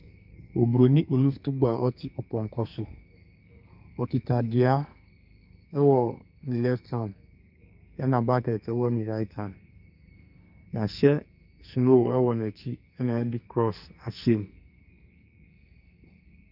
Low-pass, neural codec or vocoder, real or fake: 5.4 kHz; codec, 44.1 kHz, 2.6 kbps, SNAC; fake